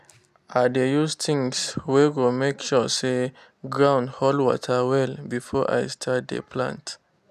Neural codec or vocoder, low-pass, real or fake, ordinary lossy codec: none; 14.4 kHz; real; none